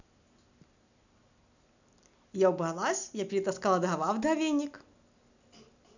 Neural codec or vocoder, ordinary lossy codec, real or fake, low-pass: none; none; real; 7.2 kHz